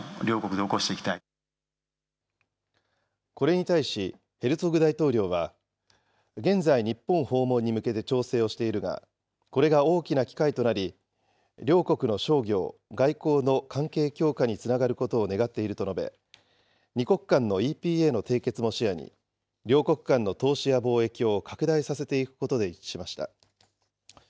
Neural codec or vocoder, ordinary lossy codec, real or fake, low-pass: none; none; real; none